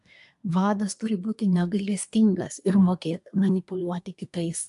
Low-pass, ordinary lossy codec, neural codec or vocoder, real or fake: 10.8 kHz; AAC, 64 kbps; codec, 24 kHz, 1 kbps, SNAC; fake